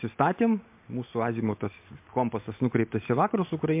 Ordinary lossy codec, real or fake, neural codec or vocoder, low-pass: MP3, 32 kbps; real; none; 3.6 kHz